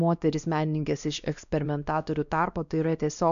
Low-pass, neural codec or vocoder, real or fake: 7.2 kHz; codec, 16 kHz, 1 kbps, X-Codec, WavLM features, trained on Multilingual LibriSpeech; fake